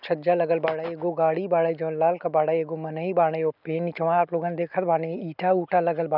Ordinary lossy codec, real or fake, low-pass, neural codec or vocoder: none; real; 5.4 kHz; none